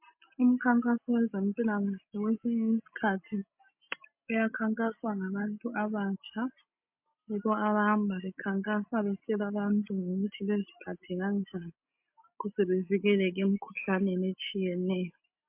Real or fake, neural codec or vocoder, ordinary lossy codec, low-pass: real; none; MP3, 32 kbps; 3.6 kHz